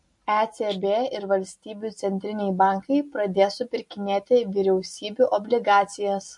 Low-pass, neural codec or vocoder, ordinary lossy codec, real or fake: 10.8 kHz; none; MP3, 48 kbps; real